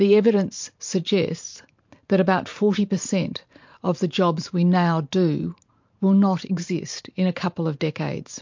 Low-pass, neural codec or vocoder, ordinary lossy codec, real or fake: 7.2 kHz; vocoder, 44.1 kHz, 128 mel bands every 512 samples, BigVGAN v2; MP3, 48 kbps; fake